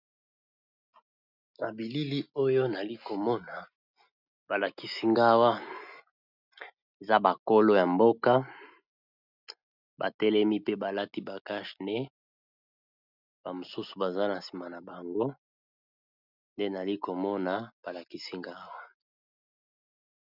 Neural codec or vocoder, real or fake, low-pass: none; real; 5.4 kHz